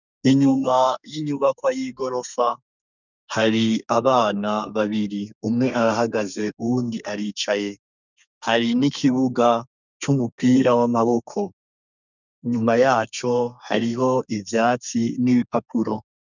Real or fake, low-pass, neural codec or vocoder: fake; 7.2 kHz; codec, 32 kHz, 1.9 kbps, SNAC